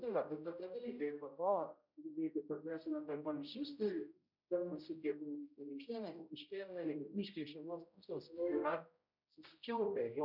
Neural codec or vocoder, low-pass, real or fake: codec, 16 kHz, 0.5 kbps, X-Codec, HuBERT features, trained on general audio; 5.4 kHz; fake